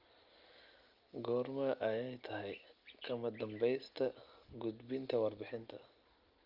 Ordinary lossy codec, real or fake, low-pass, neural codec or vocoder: Opus, 24 kbps; real; 5.4 kHz; none